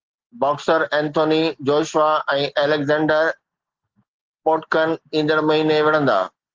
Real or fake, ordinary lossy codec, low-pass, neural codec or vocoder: real; Opus, 16 kbps; 7.2 kHz; none